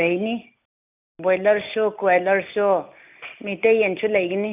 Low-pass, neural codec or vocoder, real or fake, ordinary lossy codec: 3.6 kHz; none; real; none